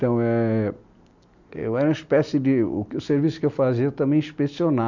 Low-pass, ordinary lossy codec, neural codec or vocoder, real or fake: 7.2 kHz; none; none; real